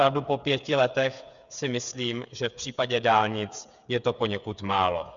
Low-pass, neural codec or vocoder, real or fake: 7.2 kHz; codec, 16 kHz, 8 kbps, FreqCodec, smaller model; fake